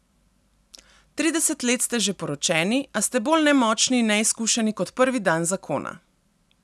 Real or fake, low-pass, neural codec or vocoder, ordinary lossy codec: real; none; none; none